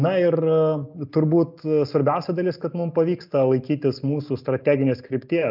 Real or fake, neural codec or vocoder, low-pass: real; none; 5.4 kHz